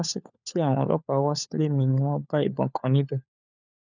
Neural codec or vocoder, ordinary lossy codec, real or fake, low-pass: codec, 16 kHz, 4 kbps, FunCodec, trained on LibriTTS, 50 frames a second; none; fake; 7.2 kHz